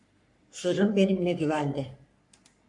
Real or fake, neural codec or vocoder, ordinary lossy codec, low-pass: fake; codec, 44.1 kHz, 3.4 kbps, Pupu-Codec; MP3, 64 kbps; 10.8 kHz